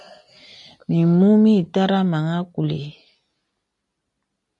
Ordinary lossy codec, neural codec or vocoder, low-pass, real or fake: MP3, 64 kbps; none; 10.8 kHz; real